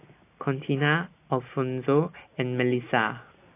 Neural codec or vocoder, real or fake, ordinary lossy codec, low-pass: none; real; AAC, 24 kbps; 3.6 kHz